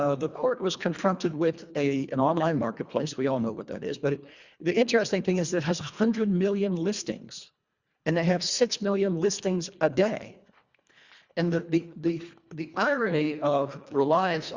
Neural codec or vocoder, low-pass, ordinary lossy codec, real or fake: codec, 24 kHz, 1.5 kbps, HILCodec; 7.2 kHz; Opus, 64 kbps; fake